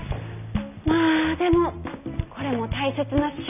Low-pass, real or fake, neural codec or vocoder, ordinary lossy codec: 3.6 kHz; real; none; none